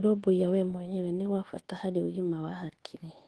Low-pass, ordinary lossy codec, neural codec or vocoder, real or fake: 10.8 kHz; Opus, 16 kbps; codec, 24 kHz, 1.2 kbps, DualCodec; fake